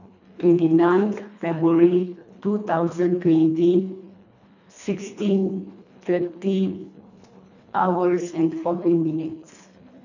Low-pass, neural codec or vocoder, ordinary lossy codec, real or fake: 7.2 kHz; codec, 24 kHz, 1.5 kbps, HILCodec; none; fake